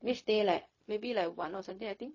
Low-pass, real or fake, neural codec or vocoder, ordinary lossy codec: 7.2 kHz; fake; codec, 16 kHz, 0.4 kbps, LongCat-Audio-Codec; MP3, 32 kbps